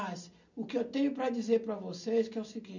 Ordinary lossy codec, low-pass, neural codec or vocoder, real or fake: none; 7.2 kHz; none; real